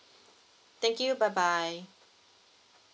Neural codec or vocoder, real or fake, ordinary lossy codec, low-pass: none; real; none; none